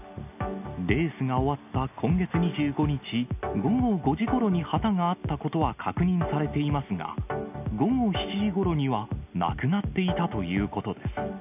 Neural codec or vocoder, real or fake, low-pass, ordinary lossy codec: none; real; 3.6 kHz; none